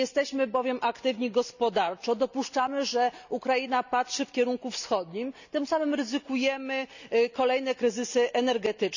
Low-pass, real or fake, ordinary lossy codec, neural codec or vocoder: 7.2 kHz; real; none; none